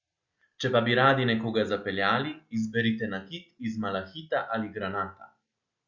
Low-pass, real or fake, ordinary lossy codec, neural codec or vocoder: 7.2 kHz; real; Opus, 64 kbps; none